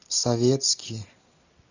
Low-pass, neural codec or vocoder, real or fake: 7.2 kHz; none; real